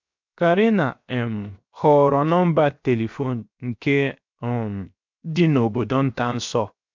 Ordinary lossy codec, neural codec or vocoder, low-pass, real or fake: MP3, 64 kbps; codec, 16 kHz, 0.7 kbps, FocalCodec; 7.2 kHz; fake